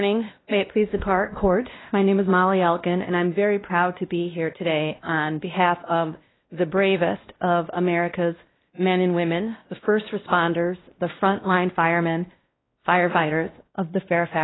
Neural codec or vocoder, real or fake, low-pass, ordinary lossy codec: codec, 16 kHz, 1 kbps, X-Codec, WavLM features, trained on Multilingual LibriSpeech; fake; 7.2 kHz; AAC, 16 kbps